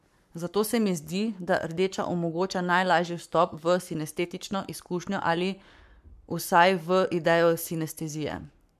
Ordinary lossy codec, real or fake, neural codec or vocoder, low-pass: MP3, 96 kbps; fake; codec, 44.1 kHz, 7.8 kbps, Pupu-Codec; 14.4 kHz